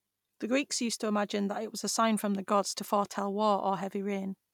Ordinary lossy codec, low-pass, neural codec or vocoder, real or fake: none; 19.8 kHz; none; real